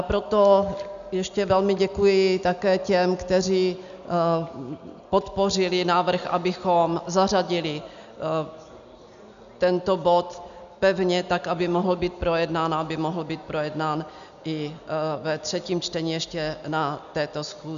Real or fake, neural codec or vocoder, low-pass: real; none; 7.2 kHz